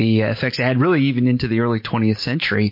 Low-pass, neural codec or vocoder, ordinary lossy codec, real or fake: 5.4 kHz; none; MP3, 32 kbps; real